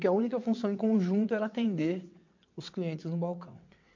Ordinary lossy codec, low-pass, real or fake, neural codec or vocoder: MP3, 48 kbps; 7.2 kHz; fake; vocoder, 22.05 kHz, 80 mel bands, WaveNeXt